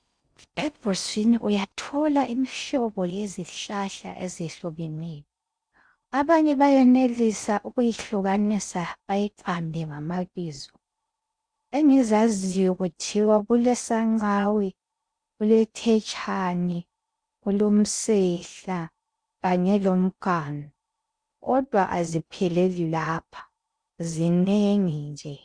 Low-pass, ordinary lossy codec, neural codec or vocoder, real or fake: 9.9 kHz; Opus, 64 kbps; codec, 16 kHz in and 24 kHz out, 0.6 kbps, FocalCodec, streaming, 4096 codes; fake